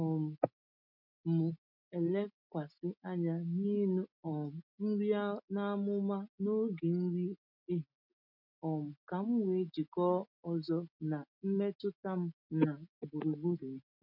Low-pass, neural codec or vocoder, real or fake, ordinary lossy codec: 5.4 kHz; none; real; MP3, 48 kbps